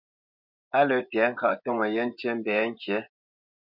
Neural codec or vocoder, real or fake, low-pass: vocoder, 24 kHz, 100 mel bands, Vocos; fake; 5.4 kHz